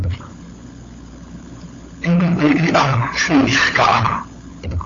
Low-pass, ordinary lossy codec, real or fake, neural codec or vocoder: 7.2 kHz; AAC, 32 kbps; fake; codec, 16 kHz, 16 kbps, FunCodec, trained on LibriTTS, 50 frames a second